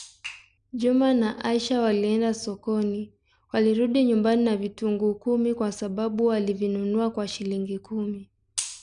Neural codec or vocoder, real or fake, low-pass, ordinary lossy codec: none; real; 9.9 kHz; none